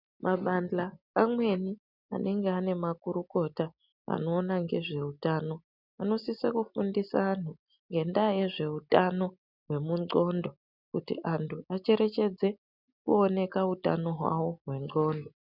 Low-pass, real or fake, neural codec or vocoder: 5.4 kHz; real; none